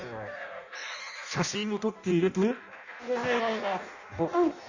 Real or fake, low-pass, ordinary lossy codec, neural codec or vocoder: fake; 7.2 kHz; Opus, 64 kbps; codec, 16 kHz in and 24 kHz out, 0.6 kbps, FireRedTTS-2 codec